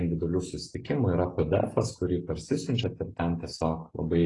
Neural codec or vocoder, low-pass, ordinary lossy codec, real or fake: none; 10.8 kHz; AAC, 32 kbps; real